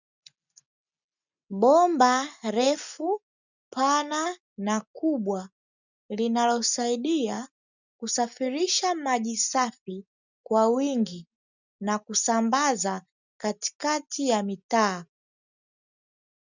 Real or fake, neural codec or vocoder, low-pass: real; none; 7.2 kHz